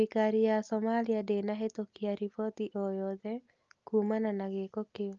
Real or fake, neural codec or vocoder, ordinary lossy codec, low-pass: real; none; Opus, 24 kbps; 7.2 kHz